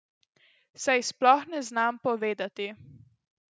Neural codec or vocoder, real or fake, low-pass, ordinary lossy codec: none; real; none; none